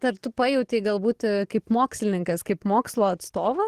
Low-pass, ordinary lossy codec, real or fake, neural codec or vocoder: 14.4 kHz; Opus, 16 kbps; fake; codec, 44.1 kHz, 7.8 kbps, DAC